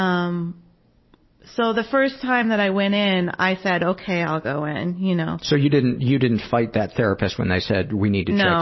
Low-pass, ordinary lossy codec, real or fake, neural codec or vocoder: 7.2 kHz; MP3, 24 kbps; real; none